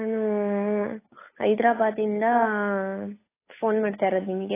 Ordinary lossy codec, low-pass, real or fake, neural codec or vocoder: AAC, 16 kbps; 3.6 kHz; fake; codec, 16 kHz, 8 kbps, FunCodec, trained on Chinese and English, 25 frames a second